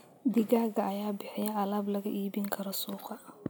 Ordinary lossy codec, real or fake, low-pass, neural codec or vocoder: none; real; none; none